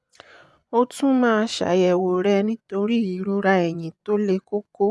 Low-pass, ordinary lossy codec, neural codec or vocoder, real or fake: none; none; vocoder, 24 kHz, 100 mel bands, Vocos; fake